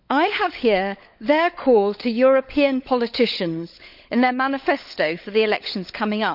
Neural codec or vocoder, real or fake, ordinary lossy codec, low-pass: codec, 16 kHz, 16 kbps, FunCodec, trained on LibriTTS, 50 frames a second; fake; none; 5.4 kHz